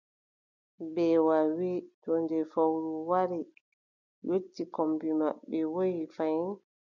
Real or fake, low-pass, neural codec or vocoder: real; 7.2 kHz; none